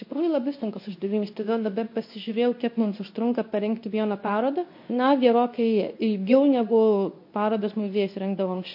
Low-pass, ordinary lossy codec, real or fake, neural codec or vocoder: 5.4 kHz; MP3, 32 kbps; fake; codec, 24 kHz, 0.9 kbps, WavTokenizer, medium speech release version 2